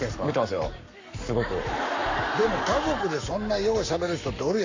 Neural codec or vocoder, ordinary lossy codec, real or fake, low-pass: codec, 44.1 kHz, 7.8 kbps, Pupu-Codec; AAC, 48 kbps; fake; 7.2 kHz